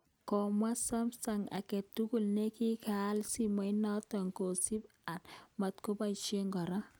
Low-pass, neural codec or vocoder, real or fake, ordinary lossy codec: none; none; real; none